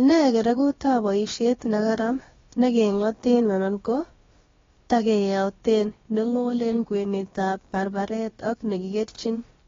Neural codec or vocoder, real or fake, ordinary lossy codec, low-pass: codec, 16 kHz, about 1 kbps, DyCAST, with the encoder's durations; fake; AAC, 24 kbps; 7.2 kHz